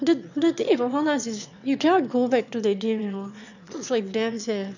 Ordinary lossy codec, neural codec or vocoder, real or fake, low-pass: none; autoencoder, 22.05 kHz, a latent of 192 numbers a frame, VITS, trained on one speaker; fake; 7.2 kHz